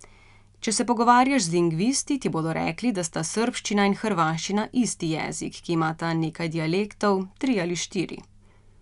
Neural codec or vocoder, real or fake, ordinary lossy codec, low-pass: none; real; none; 10.8 kHz